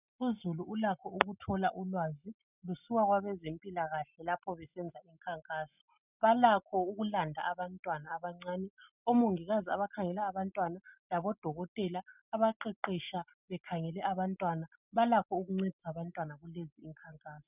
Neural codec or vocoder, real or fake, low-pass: none; real; 3.6 kHz